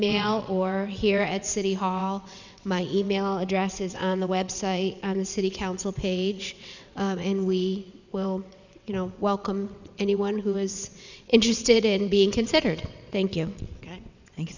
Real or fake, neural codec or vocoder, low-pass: fake; vocoder, 22.05 kHz, 80 mel bands, WaveNeXt; 7.2 kHz